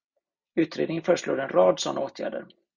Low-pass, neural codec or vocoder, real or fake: 7.2 kHz; none; real